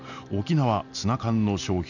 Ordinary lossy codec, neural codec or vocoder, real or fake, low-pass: none; none; real; 7.2 kHz